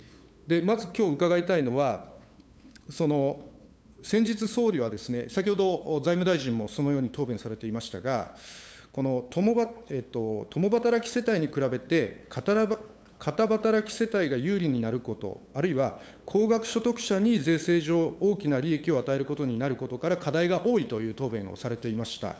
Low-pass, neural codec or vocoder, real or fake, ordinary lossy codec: none; codec, 16 kHz, 8 kbps, FunCodec, trained on LibriTTS, 25 frames a second; fake; none